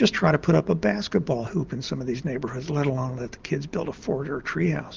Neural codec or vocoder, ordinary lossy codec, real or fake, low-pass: none; Opus, 32 kbps; real; 7.2 kHz